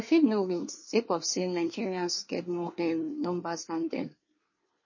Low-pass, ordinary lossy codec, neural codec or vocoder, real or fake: 7.2 kHz; MP3, 32 kbps; codec, 24 kHz, 1 kbps, SNAC; fake